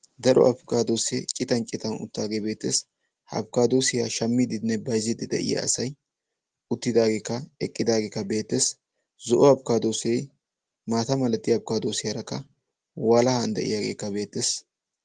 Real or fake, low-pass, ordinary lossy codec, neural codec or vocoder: real; 9.9 kHz; Opus, 24 kbps; none